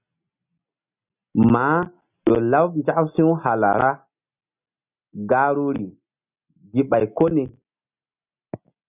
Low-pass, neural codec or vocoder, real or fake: 3.6 kHz; none; real